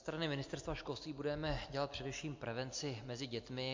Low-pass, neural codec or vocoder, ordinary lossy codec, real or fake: 7.2 kHz; none; MP3, 48 kbps; real